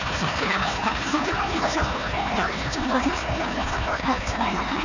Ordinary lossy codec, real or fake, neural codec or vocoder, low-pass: none; fake; codec, 16 kHz, 1 kbps, FunCodec, trained on Chinese and English, 50 frames a second; 7.2 kHz